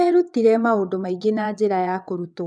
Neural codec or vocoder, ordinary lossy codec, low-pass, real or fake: vocoder, 22.05 kHz, 80 mel bands, WaveNeXt; none; 9.9 kHz; fake